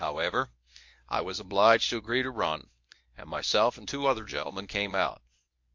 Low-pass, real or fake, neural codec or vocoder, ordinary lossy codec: 7.2 kHz; fake; codec, 24 kHz, 0.9 kbps, WavTokenizer, medium speech release version 1; MP3, 48 kbps